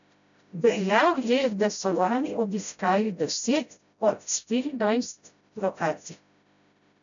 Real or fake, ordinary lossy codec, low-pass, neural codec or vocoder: fake; MP3, 96 kbps; 7.2 kHz; codec, 16 kHz, 0.5 kbps, FreqCodec, smaller model